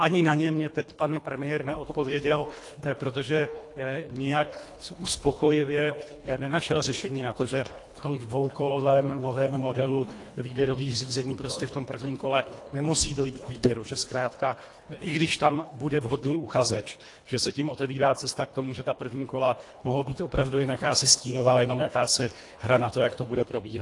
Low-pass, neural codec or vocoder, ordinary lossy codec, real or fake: 10.8 kHz; codec, 24 kHz, 1.5 kbps, HILCodec; AAC, 48 kbps; fake